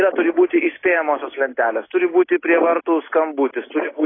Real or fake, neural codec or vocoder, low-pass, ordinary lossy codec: real; none; 7.2 kHz; AAC, 16 kbps